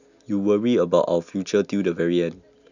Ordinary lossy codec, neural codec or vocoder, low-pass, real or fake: none; none; 7.2 kHz; real